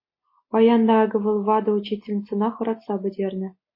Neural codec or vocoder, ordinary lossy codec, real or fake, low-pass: none; MP3, 24 kbps; real; 5.4 kHz